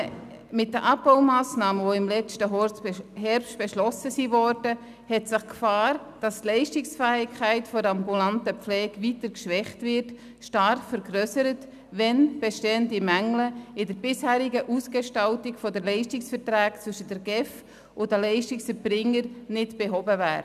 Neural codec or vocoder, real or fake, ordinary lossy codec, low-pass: none; real; none; 14.4 kHz